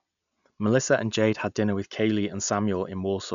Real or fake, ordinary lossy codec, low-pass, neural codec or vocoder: real; none; 7.2 kHz; none